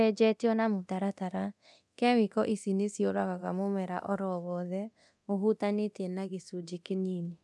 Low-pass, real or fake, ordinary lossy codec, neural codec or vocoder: none; fake; none; codec, 24 kHz, 0.9 kbps, DualCodec